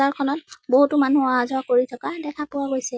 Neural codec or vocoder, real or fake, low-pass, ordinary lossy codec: none; real; none; none